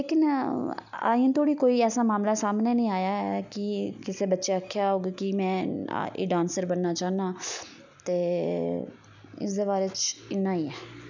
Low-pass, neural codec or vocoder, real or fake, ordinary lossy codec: 7.2 kHz; none; real; none